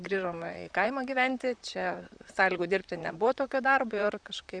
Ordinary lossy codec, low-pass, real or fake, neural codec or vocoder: Opus, 64 kbps; 9.9 kHz; fake; vocoder, 44.1 kHz, 128 mel bands, Pupu-Vocoder